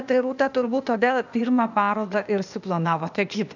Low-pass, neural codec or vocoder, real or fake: 7.2 kHz; codec, 16 kHz, 0.8 kbps, ZipCodec; fake